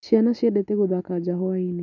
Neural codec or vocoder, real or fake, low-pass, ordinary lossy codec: none; real; 7.2 kHz; none